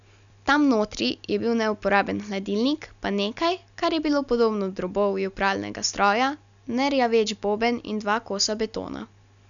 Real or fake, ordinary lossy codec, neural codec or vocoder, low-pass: real; none; none; 7.2 kHz